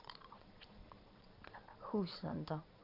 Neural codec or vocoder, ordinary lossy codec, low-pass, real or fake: vocoder, 22.05 kHz, 80 mel bands, Vocos; Opus, 64 kbps; 5.4 kHz; fake